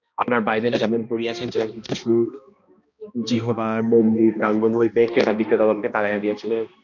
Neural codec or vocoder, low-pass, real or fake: codec, 16 kHz, 1 kbps, X-Codec, HuBERT features, trained on balanced general audio; 7.2 kHz; fake